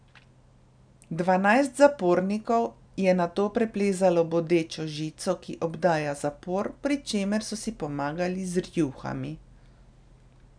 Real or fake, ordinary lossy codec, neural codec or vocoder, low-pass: real; none; none; 9.9 kHz